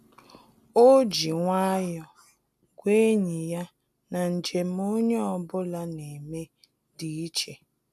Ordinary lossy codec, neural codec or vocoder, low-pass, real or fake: none; none; 14.4 kHz; real